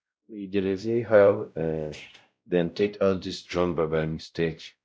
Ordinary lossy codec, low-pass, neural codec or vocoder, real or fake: none; none; codec, 16 kHz, 0.5 kbps, X-Codec, WavLM features, trained on Multilingual LibriSpeech; fake